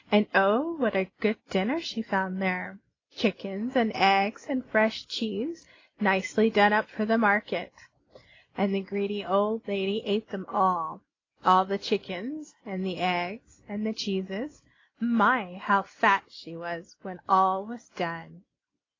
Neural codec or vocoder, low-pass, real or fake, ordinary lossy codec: none; 7.2 kHz; real; AAC, 32 kbps